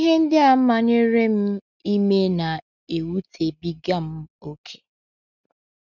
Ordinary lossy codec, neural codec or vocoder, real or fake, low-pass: none; none; real; 7.2 kHz